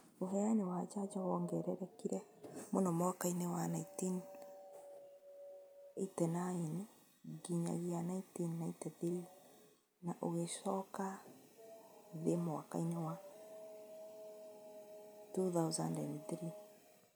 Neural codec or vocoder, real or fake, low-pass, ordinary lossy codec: none; real; none; none